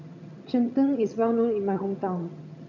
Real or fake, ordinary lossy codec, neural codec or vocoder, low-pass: fake; none; vocoder, 22.05 kHz, 80 mel bands, HiFi-GAN; 7.2 kHz